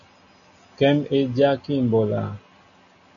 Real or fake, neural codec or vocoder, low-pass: real; none; 7.2 kHz